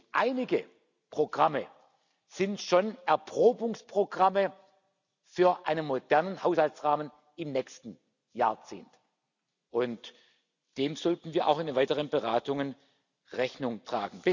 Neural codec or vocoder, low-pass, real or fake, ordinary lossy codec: none; 7.2 kHz; real; none